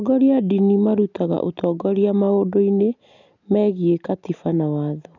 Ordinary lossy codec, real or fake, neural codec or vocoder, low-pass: none; real; none; 7.2 kHz